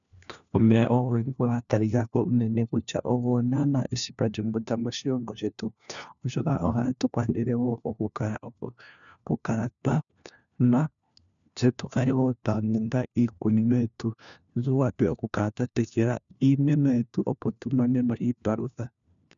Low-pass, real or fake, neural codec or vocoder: 7.2 kHz; fake; codec, 16 kHz, 1 kbps, FunCodec, trained on LibriTTS, 50 frames a second